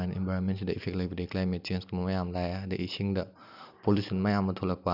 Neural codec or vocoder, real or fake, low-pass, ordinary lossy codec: none; real; 5.4 kHz; none